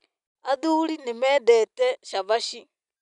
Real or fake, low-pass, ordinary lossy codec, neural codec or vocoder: real; 10.8 kHz; none; none